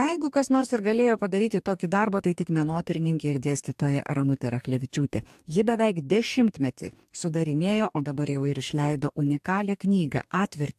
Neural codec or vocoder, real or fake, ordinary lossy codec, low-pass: codec, 44.1 kHz, 2.6 kbps, DAC; fake; AAC, 96 kbps; 14.4 kHz